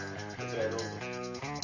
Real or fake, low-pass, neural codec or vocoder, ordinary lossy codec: real; 7.2 kHz; none; Opus, 64 kbps